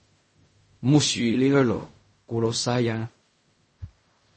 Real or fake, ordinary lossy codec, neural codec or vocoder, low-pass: fake; MP3, 32 kbps; codec, 16 kHz in and 24 kHz out, 0.4 kbps, LongCat-Audio-Codec, fine tuned four codebook decoder; 10.8 kHz